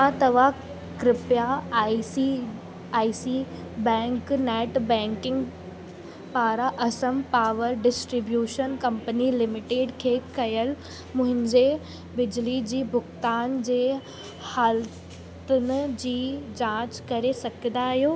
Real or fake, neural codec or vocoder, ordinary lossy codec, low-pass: real; none; none; none